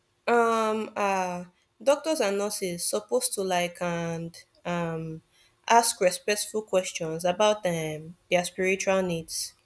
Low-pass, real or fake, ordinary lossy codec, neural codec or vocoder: none; real; none; none